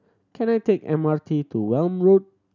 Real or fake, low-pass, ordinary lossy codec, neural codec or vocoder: real; 7.2 kHz; none; none